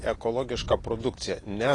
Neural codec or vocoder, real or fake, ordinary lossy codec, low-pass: none; real; AAC, 32 kbps; 10.8 kHz